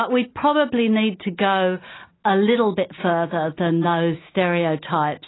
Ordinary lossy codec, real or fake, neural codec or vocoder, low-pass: AAC, 16 kbps; real; none; 7.2 kHz